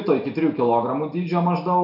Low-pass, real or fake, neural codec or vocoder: 5.4 kHz; real; none